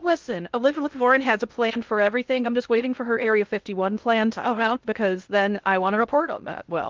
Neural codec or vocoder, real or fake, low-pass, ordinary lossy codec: codec, 16 kHz in and 24 kHz out, 0.6 kbps, FocalCodec, streaming, 2048 codes; fake; 7.2 kHz; Opus, 24 kbps